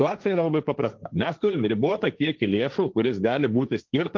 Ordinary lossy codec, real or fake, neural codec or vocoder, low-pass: Opus, 32 kbps; fake; codec, 16 kHz, 1.1 kbps, Voila-Tokenizer; 7.2 kHz